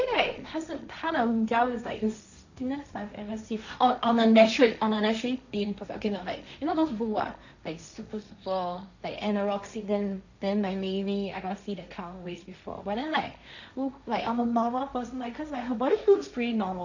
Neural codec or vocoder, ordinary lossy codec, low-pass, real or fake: codec, 16 kHz, 1.1 kbps, Voila-Tokenizer; none; 7.2 kHz; fake